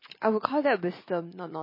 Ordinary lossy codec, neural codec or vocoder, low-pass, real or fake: MP3, 24 kbps; none; 5.4 kHz; real